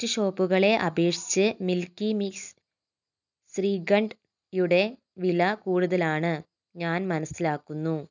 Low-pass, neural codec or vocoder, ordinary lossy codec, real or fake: 7.2 kHz; none; none; real